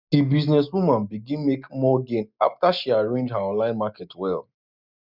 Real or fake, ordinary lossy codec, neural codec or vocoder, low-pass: real; none; none; 5.4 kHz